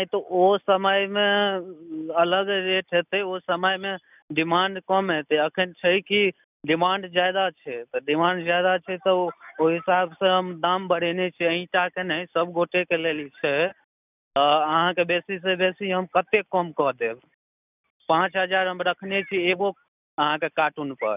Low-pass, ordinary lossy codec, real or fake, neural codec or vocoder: 3.6 kHz; none; real; none